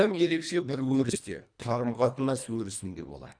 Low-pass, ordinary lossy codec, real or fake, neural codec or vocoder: 9.9 kHz; none; fake; codec, 24 kHz, 1.5 kbps, HILCodec